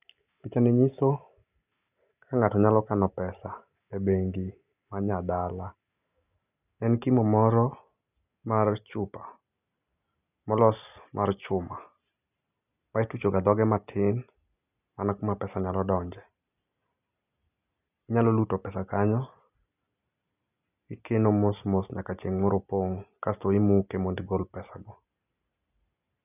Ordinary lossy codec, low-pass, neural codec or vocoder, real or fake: none; 3.6 kHz; none; real